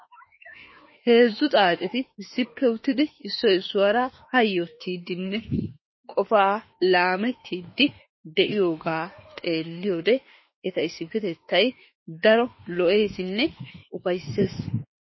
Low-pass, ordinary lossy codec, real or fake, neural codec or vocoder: 7.2 kHz; MP3, 24 kbps; fake; autoencoder, 48 kHz, 32 numbers a frame, DAC-VAE, trained on Japanese speech